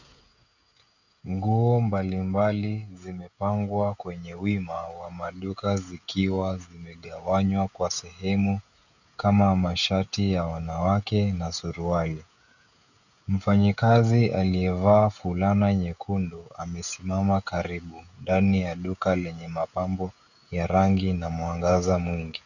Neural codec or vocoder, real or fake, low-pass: codec, 16 kHz, 16 kbps, FreqCodec, smaller model; fake; 7.2 kHz